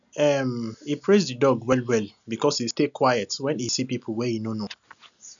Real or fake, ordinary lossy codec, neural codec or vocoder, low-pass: real; none; none; 7.2 kHz